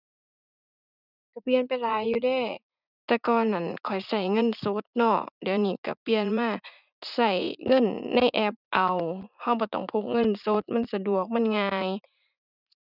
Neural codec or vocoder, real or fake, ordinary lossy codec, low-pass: vocoder, 44.1 kHz, 80 mel bands, Vocos; fake; none; 5.4 kHz